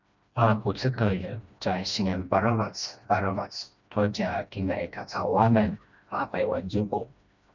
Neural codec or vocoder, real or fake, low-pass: codec, 16 kHz, 1 kbps, FreqCodec, smaller model; fake; 7.2 kHz